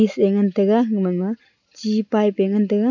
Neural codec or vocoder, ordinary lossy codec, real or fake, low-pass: none; none; real; 7.2 kHz